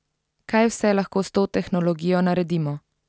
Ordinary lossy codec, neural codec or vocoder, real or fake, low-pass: none; none; real; none